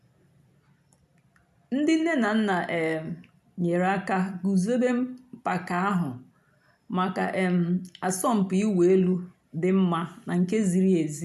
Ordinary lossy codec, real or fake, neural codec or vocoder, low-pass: none; real; none; 14.4 kHz